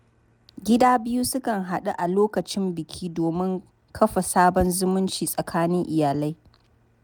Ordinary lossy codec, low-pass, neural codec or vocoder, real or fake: none; none; none; real